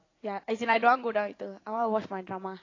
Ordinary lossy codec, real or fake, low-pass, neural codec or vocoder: AAC, 32 kbps; fake; 7.2 kHz; vocoder, 44.1 kHz, 80 mel bands, Vocos